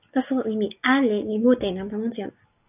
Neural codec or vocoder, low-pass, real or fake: none; 3.6 kHz; real